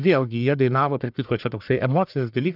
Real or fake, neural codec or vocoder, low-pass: fake; codec, 44.1 kHz, 1.7 kbps, Pupu-Codec; 5.4 kHz